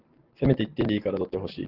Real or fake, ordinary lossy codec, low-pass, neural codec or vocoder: real; Opus, 16 kbps; 5.4 kHz; none